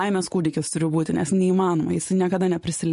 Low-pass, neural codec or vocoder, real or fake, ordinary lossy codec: 14.4 kHz; vocoder, 44.1 kHz, 128 mel bands every 512 samples, BigVGAN v2; fake; MP3, 48 kbps